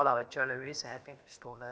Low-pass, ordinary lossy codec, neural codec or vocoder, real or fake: none; none; codec, 16 kHz, about 1 kbps, DyCAST, with the encoder's durations; fake